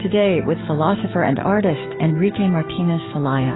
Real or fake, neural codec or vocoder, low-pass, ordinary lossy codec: fake; codec, 44.1 kHz, 7.8 kbps, DAC; 7.2 kHz; AAC, 16 kbps